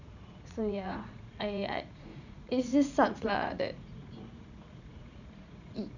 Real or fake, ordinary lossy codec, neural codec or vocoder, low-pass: fake; none; vocoder, 44.1 kHz, 80 mel bands, Vocos; 7.2 kHz